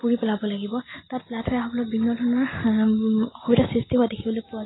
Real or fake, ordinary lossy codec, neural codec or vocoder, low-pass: real; AAC, 16 kbps; none; 7.2 kHz